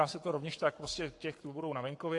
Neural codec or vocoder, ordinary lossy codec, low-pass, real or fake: codec, 44.1 kHz, 7.8 kbps, Pupu-Codec; AAC, 48 kbps; 10.8 kHz; fake